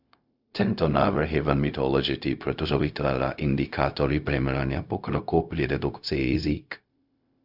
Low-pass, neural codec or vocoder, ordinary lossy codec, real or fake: 5.4 kHz; codec, 16 kHz, 0.4 kbps, LongCat-Audio-Codec; Opus, 64 kbps; fake